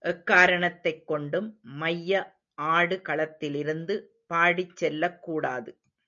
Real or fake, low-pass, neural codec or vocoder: real; 7.2 kHz; none